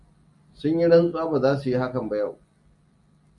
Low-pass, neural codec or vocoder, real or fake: 10.8 kHz; none; real